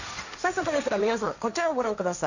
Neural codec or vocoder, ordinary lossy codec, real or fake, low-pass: codec, 16 kHz, 1.1 kbps, Voila-Tokenizer; none; fake; 7.2 kHz